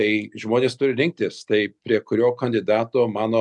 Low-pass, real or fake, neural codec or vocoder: 10.8 kHz; real; none